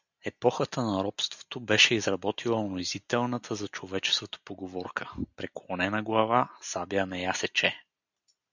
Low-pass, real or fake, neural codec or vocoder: 7.2 kHz; real; none